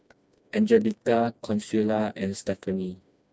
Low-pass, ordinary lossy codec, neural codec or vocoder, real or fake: none; none; codec, 16 kHz, 2 kbps, FreqCodec, smaller model; fake